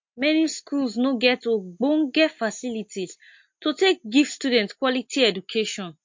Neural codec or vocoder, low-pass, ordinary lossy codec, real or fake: none; 7.2 kHz; MP3, 48 kbps; real